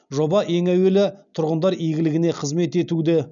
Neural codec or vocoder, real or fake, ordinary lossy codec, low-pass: none; real; none; 7.2 kHz